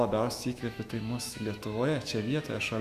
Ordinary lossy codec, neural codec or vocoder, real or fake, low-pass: Opus, 64 kbps; autoencoder, 48 kHz, 128 numbers a frame, DAC-VAE, trained on Japanese speech; fake; 14.4 kHz